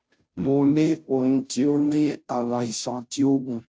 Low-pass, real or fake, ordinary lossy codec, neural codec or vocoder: none; fake; none; codec, 16 kHz, 0.5 kbps, FunCodec, trained on Chinese and English, 25 frames a second